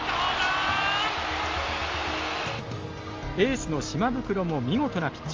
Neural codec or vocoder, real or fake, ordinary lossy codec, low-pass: none; real; Opus, 32 kbps; 7.2 kHz